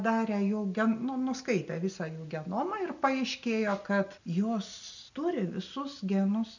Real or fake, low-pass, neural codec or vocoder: real; 7.2 kHz; none